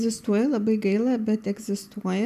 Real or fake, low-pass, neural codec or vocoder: real; 14.4 kHz; none